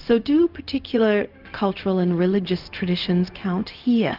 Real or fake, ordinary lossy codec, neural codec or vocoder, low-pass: fake; Opus, 32 kbps; codec, 16 kHz, 0.4 kbps, LongCat-Audio-Codec; 5.4 kHz